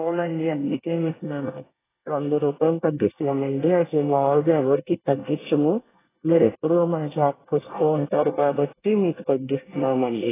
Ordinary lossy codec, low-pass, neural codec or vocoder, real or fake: AAC, 16 kbps; 3.6 kHz; codec, 24 kHz, 1 kbps, SNAC; fake